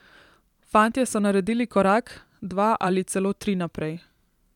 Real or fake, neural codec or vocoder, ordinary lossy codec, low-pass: fake; vocoder, 44.1 kHz, 128 mel bands every 512 samples, BigVGAN v2; none; 19.8 kHz